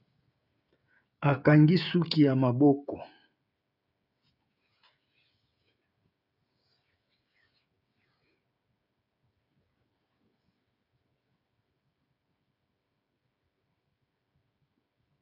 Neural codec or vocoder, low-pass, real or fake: codec, 16 kHz, 16 kbps, FreqCodec, smaller model; 5.4 kHz; fake